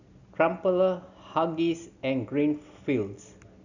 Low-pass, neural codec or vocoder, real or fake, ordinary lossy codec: 7.2 kHz; none; real; none